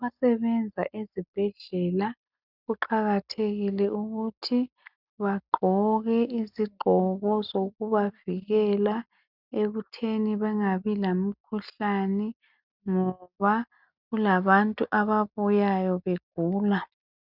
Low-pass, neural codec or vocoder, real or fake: 5.4 kHz; none; real